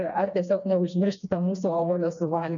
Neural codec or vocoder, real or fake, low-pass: codec, 16 kHz, 2 kbps, FreqCodec, smaller model; fake; 7.2 kHz